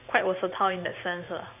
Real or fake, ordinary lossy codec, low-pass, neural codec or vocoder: fake; none; 3.6 kHz; vocoder, 44.1 kHz, 128 mel bands every 512 samples, BigVGAN v2